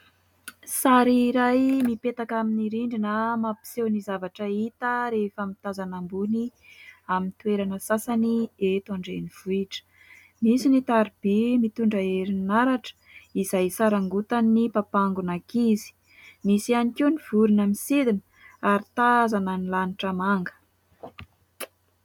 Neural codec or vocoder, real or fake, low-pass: none; real; 19.8 kHz